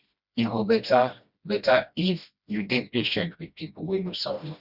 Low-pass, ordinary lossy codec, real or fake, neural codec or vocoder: 5.4 kHz; Opus, 64 kbps; fake; codec, 16 kHz, 1 kbps, FreqCodec, smaller model